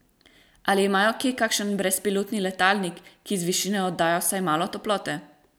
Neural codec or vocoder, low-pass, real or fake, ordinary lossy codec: none; none; real; none